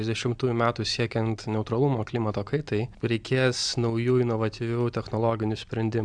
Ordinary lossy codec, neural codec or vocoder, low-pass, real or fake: MP3, 96 kbps; none; 9.9 kHz; real